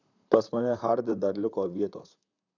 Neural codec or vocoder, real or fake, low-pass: vocoder, 44.1 kHz, 128 mel bands, Pupu-Vocoder; fake; 7.2 kHz